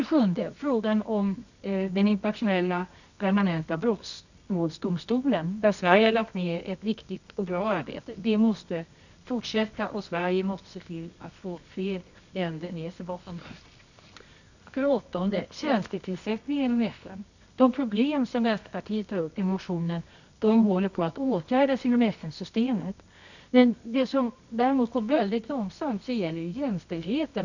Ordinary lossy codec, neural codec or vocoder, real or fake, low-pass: none; codec, 24 kHz, 0.9 kbps, WavTokenizer, medium music audio release; fake; 7.2 kHz